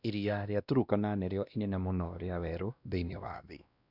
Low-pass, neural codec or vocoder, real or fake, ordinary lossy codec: 5.4 kHz; codec, 16 kHz, 1 kbps, X-Codec, WavLM features, trained on Multilingual LibriSpeech; fake; none